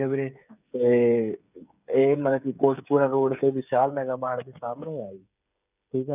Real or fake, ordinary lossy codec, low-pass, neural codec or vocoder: fake; none; 3.6 kHz; codec, 16 kHz, 8 kbps, FreqCodec, smaller model